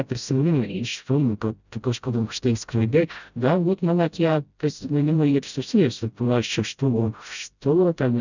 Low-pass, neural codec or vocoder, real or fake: 7.2 kHz; codec, 16 kHz, 0.5 kbps, FreqCodec, smaller model; fake